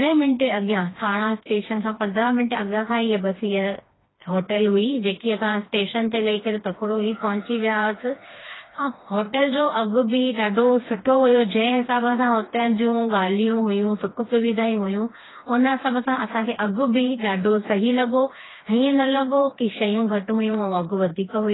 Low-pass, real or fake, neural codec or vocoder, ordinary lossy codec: 7.2 kHz; fake; codec, 16 kHz, 2 kbps, FreqCodec, smaller model; AAC, 16 kbps